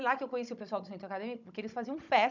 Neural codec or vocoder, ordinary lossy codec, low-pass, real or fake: codec, 16 kHz, 16 kbps, FunCodec, trained on Chinese and English, 50 frames a second; none; 7.2 kHz; fake